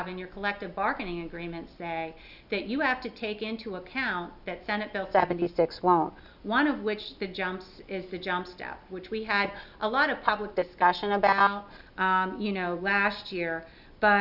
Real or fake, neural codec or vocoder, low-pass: real; none; 5.4 kHz